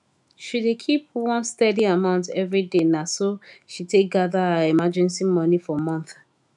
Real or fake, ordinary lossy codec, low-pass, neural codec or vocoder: fake; none; 10.8 kHz; autoencoder, 48 kHz, 128 numbers a frame, DAC-VAE, trained on Japanese speech